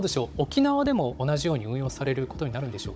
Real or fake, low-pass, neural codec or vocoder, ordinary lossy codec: fake; none; codec, 16 kHz, 16 kbps, FunCodec, trained on Chinese and English, 50 frames a second; none